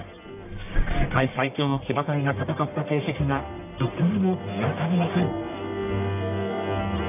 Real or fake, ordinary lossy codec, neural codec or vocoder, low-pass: fake; none; codec, 44.1 kHz, 1.7 kbps, Pupu-Codec; 3.6 kHz